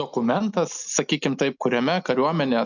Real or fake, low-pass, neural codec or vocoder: real; 7.2 kHz; none